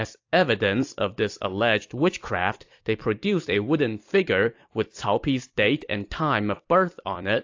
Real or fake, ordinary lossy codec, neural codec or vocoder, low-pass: fake; AAC, 48 kbps; vocoder, 44.1 kHz, 128 mel bands every 256 samples, BigVGAN v2; 7.2 kHz